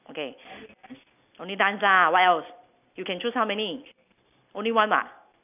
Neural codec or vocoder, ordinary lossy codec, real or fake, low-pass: none; none; real; 3.6 kHz